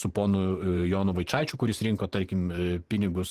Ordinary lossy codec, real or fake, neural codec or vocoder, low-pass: Opus, 16 kbps; fake; vocoder, 44.1 kHz, 128 mel bands every 512 samples, BigVGAN v2; 14.4 kHz